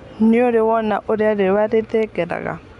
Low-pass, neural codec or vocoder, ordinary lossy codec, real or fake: 10.8 kHz; none; none; real